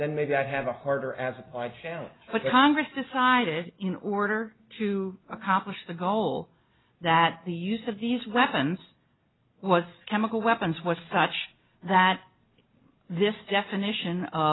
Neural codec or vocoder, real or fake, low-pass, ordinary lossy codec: none; real; 7.2 kHz; AAC, 16 kbps